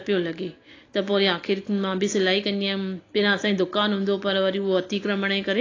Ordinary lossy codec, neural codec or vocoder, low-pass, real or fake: AAC, 32 kbps; none; 7.2 kHz; real